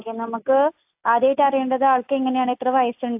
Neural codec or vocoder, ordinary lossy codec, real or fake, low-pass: none; AAC, 32 kbps; real; 3.6 kHz